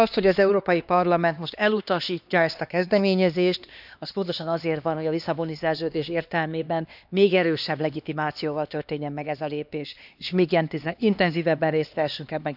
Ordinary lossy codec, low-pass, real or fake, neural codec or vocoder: none; 5.4 kHz; fake; codec, 16 kHz, 2 kbps, X-Codec, HuBERT features, trained on LibriSpeech